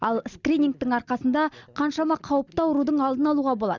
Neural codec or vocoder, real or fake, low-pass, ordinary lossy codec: none; real; 7.2 kHz; Opus, 64 kbps